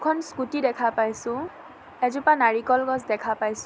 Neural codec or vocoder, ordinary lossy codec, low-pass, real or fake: none; none; none; real